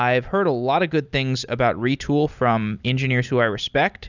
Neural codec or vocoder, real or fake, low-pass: none; real; 7.2 kHz